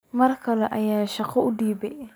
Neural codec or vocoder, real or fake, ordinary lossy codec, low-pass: none; real; none; none